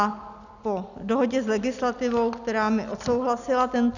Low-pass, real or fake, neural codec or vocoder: 7.2 kHz; real; none